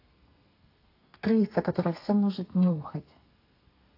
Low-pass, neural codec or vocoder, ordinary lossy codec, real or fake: 5.4 kHz; codec, 32 kHz, 1.9 kbps, SNAC; AAC, 32 kbps; fake